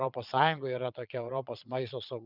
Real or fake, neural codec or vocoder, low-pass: fake; vocoder, 22.05 kHz, 80 mel bands, Vocos; 5.4 kHz